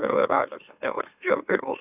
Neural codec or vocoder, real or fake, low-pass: autoencoder, 44.1 kHz, a latent of 192 numbers a frame, MeloTTS; fake; 3.6 kHz